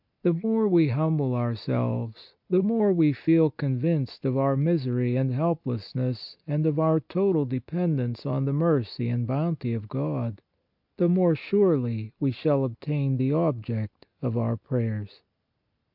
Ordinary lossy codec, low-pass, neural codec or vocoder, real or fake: MP3, 48 kbps; 5.4 kHz; none; real